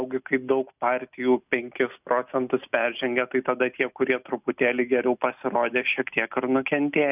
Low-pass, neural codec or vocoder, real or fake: 3.6 kHz; none; real